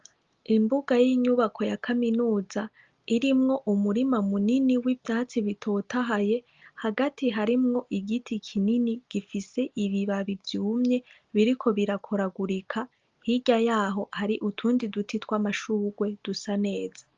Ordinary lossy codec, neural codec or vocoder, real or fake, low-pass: Opus, 32 kbps; none; real; 7.2 kHz